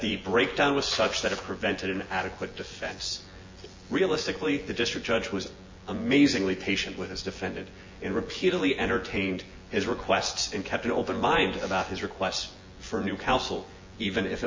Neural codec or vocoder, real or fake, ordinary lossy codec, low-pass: vocoder, 24 kHz, 100 mel bands, Vocos; fake; MP3, 32 kbps; 7.2 kHz